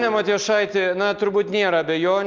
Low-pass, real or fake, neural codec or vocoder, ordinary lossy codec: 7.2 kHz; real; none; Opus, 24 kbps